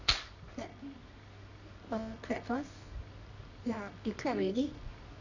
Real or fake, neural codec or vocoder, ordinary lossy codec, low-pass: fake; codec, 24 kHz, 0.9 kbps, WavTokenizer, medium music audio release; none; 7.2 kHz